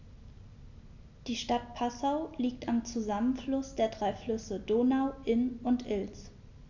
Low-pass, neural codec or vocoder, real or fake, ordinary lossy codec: 7.2 kHz; none; real; none